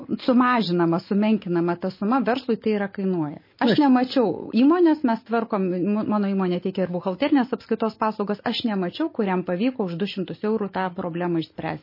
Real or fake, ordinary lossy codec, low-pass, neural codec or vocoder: real; MP3, 24 kbps; 5.4 kHz; none